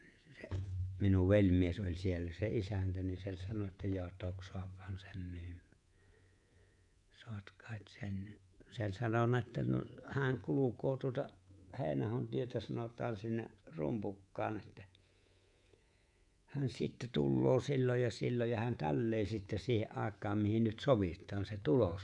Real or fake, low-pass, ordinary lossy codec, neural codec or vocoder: fake; 10.8 kHz; none; codec, 24 kHz, 3.1 kbps, DualCodec